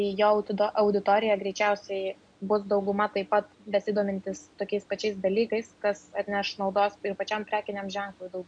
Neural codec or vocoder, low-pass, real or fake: none; 9.9 kHz; real